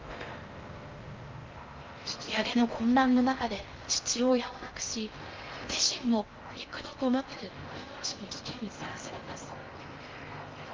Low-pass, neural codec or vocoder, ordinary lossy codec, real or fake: 7.2 kHz; codec, 16 kHz in and 24 kHz out, 0.6 kbps, FocalCodec, streaming, 4096 codes; Opus, 24 kbps; fake